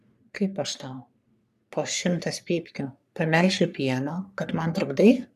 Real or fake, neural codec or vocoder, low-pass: fake; codec, 44.1 kHz, 3.4 kbps, Pupu-Codec; 14.4 kHz